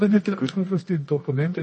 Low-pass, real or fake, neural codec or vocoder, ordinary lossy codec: 10.8 kHz; fake; codec, 24 kHz, 0.9 kbps, WavTokenizer, medium music audio release; MP3, 32 kbps